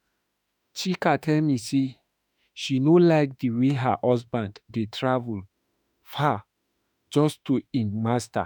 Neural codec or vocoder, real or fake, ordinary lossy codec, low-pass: autoencoder, 48 kHz, 32 numbers a frame, DAC-VAE, trained on Japanese speech; fake; none; 19.8 kHz